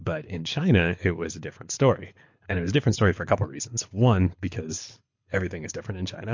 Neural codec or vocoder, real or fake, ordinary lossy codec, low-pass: codec, 24 kHz, 6 kbps, HILCodec; fake; MP3, 48 kbps; 7.2 kHz